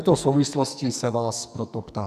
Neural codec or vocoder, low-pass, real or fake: codec, 44.1 kHz, 2.6 kbps, SNAC; 14.4 kHz; fake